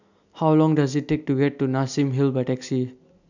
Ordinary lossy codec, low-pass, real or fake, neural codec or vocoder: none; 7.2 kHz; real; none